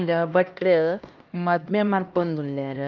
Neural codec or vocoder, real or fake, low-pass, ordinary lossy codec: codec, 16 kHz, 1 kbps, X-Codec, HuBERT features, trained on LibriSpeech; fake; 7.2 kHz; Opus, 24 kbps